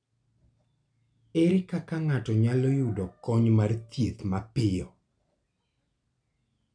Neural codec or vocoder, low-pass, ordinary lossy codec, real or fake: none; 9.9 kHz; none; real